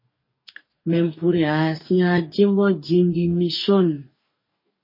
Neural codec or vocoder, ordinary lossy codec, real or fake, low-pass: codec, 44.1 kHz, 2.6 kbps, DAC; MP3, 24 kbps; fake; 5.4 kHz